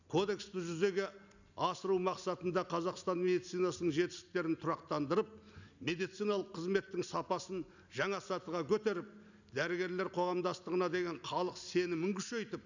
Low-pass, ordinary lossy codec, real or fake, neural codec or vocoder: 7.2 kHz; none; real; none